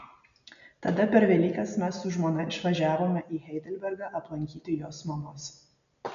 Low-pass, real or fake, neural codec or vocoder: 7.2 kHz; real; none